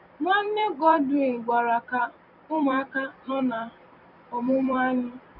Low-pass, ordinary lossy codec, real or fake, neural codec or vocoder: 5.4 kHz; none; fake; vocoder, 44.1 kHz, 128 mel bands every 256 samples, BigVGAN v2